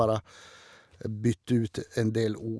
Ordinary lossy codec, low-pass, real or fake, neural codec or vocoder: none; none; real; none